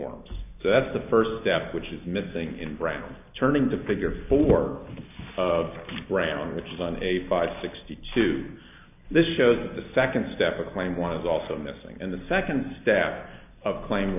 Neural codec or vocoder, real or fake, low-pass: none; real; 3.6 kHz